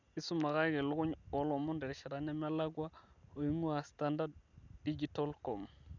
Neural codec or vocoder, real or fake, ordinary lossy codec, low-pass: none; real; none; 7.2 kHz